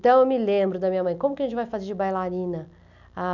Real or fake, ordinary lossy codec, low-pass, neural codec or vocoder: real; none; 7.2 kHz; none